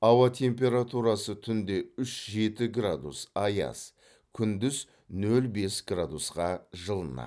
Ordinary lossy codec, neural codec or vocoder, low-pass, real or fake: none; none; none; real